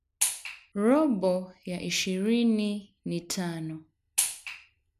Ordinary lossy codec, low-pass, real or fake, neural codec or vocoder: none; 14.4 kHz; real; none